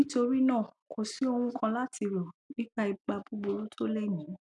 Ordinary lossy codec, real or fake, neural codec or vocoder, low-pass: none; real; none; 10.8 kHz